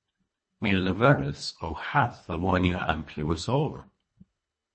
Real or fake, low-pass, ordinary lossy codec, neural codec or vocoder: fake; 9.9 kHz; MP3, 32 kbps; codec, 24 kHz, 1.5 kbps, HILCodec